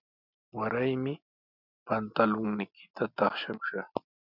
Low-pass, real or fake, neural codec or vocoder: 5.4 kHz; real; none